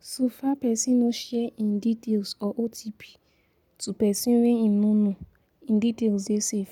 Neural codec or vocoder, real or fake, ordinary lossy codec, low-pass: none; real; none; 19.8 kHz